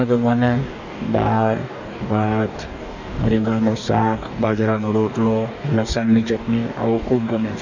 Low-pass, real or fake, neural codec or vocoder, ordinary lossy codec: 7.2 kHz; fake; codec, 44.1 kHz, 2.6 kbps, DAC; none